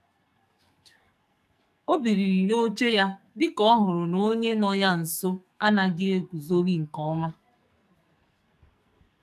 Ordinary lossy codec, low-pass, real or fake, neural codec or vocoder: none; 14.4 kHz; fake; codec, 44.1 kHz, 2.6 kbps, SNAC